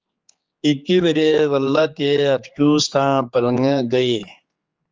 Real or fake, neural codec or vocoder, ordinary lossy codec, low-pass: fake; codec, 16 kHz, 4 kbps, X-Codec, HuBERT features, trained on general audio; Opus, 24 kbps; 7.2 kHz